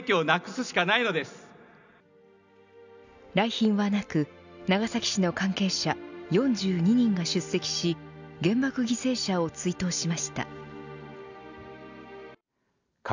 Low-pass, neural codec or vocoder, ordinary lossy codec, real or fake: 7.2 kHz; none; none; real